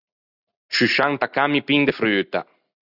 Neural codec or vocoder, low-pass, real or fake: none; 5.4 kHz; real